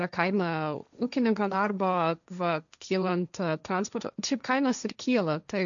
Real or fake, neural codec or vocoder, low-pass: fake; codec, 16 kHz, 1.1 kbps, Voila-Tokenizer; 7.2 kHz